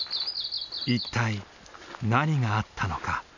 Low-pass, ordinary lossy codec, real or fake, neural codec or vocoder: 7.2 kHz; none; real; none